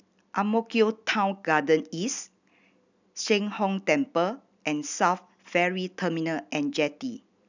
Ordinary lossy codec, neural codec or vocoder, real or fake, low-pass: none; none; real; 7.2 kHz